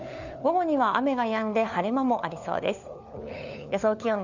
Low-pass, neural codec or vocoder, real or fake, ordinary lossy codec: 7.2 kHz; codec, 16 kHz, 4 kbps, FunCodec, trained on LibriTTS, 50 frames a second; fake; none